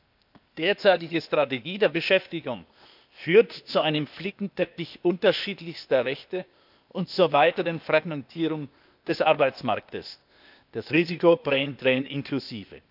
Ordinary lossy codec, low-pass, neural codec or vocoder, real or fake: none; 5.4 kHz; codec, 16 kHz, 0.8 kbps, ZipCodec; fake